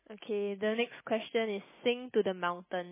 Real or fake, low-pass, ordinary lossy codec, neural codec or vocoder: real; 3.6 kHz; MP3, 16 kbps; none